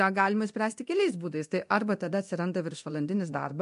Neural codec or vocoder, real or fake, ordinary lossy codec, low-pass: codec, 24 kHz, 0.9 kbps, DualCodec; fake; MP3, 64 kbps; 10.8 kHz